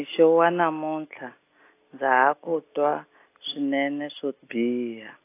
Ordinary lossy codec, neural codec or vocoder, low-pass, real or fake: AAC, 24 kbps; none; 3.6 kHz; real